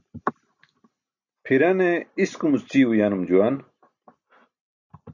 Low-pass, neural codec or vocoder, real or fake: 7.2 kHz; none; real